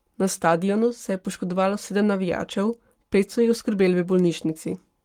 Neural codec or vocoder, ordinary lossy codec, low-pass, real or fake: codec, 44.1 kHz, 7.8 kbps, Pupu-Codec; Opus, 24 kbps; 19.8 kHz; fake